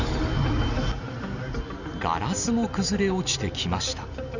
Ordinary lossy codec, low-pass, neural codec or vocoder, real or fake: none; 7.2 kHz; none; real